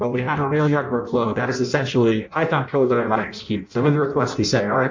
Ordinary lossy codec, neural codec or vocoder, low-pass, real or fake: MP3, 48 kbps; codec, 16 kHz in and 24 kHz out, 0.6 kbps, FireRedTTS-2 codec; 7.2 kHz; fake